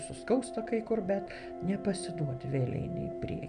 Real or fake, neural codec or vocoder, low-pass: real; none; 9.9 kHz